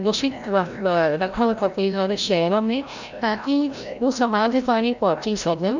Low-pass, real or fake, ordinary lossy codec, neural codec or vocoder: 7.2 kHz; fake; none; codec, 16 kHz, 0.5 kbps, FreqCodec, larger model